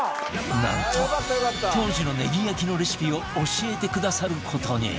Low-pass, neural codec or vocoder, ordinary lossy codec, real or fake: none; none; none; real